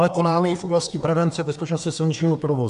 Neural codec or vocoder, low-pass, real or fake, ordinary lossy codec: codec, 24 kHz, 1 kbps, SNAC; 10.8 kHz; fake; AAC, 96 kbps